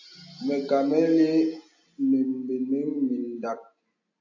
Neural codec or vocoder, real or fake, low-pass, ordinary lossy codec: none; real; 7.2 kHz; AAC, 48 kbps